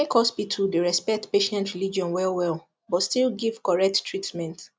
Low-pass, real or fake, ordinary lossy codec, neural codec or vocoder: none; real; none; none